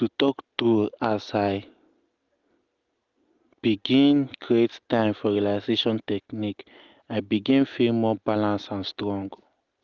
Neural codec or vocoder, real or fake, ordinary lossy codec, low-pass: none; real; Opus, 24 kbps; 7.2 kHz